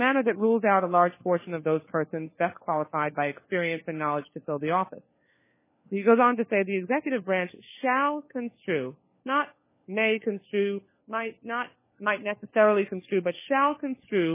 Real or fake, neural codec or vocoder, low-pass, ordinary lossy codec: fake; codec, 16 kHz, 4 kbps, FunCodec, trained on LibriTTS, 50 frames a second; 3.6 kHz; MP3, 16 kbps